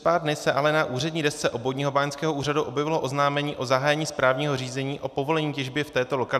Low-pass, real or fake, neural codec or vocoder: 14.4 kHz; real; none